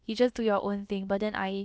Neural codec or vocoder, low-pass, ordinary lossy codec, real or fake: codec, 16 kHz, about 1 kbps, DyCAST, with the encoder's durations; none; none; fake